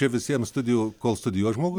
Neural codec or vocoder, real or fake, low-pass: none; real; 19.8 kHz